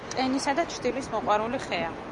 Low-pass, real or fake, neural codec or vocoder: 10.8 kHz; real; none